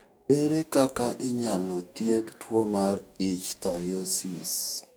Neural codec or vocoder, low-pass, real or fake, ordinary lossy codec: codec, 44.1 kHz, 2.6 kbps, DAC; none; fake; none